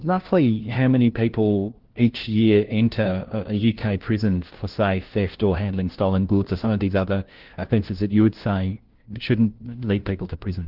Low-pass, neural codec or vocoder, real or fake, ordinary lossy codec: 5.4 kHz; codec, 16 kHz, 1 kbps, FunCodec, trained on LibriTTS, 50 frames a second; fake; Opus, 16 kbps